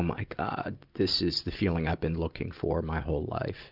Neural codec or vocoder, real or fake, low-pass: none; real; 5.4 kHz